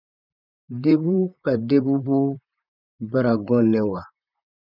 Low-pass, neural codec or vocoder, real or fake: 5.4 kHz; vocoder, 44.1 kHz, 128 mel bands, Pupu-Vocoder; fake